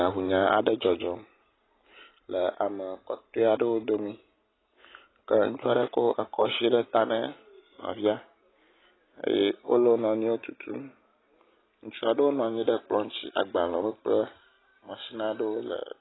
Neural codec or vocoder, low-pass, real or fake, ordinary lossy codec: none; 7.2 kHz; real; AAC, 16 kbps